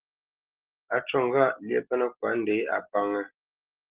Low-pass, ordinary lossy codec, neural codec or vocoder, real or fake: 3.6 kHz; Opus, 16 kbps; none; real